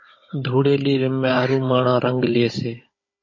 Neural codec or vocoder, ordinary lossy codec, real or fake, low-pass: codec, 16 kHz, 16 kbps, FunCodec, trained on Chinese and English, 50 frames a second; MP3, 32 kbps; fake; 7.2 kHz